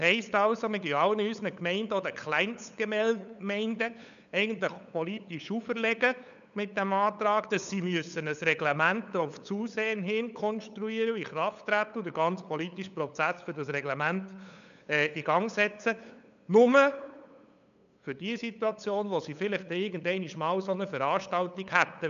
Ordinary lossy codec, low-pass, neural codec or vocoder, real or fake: none; 7.2 kHz; codec, 16 kHz, 8 kbps, FunCodec, trained on LibriTTS, 25 frames a second; fake